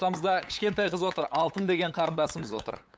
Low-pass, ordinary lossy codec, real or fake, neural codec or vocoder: none; none; fake; codec, 16 kHz, 8 kbps, FunCodec, trained on LibriTTS, 25 frames a second